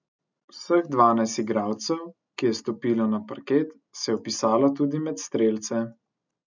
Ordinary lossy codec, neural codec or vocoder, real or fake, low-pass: none; none; real; 7.2 kHz